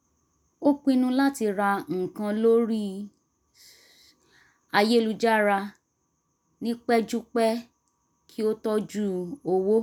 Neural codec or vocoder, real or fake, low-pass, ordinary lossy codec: none; real; none; none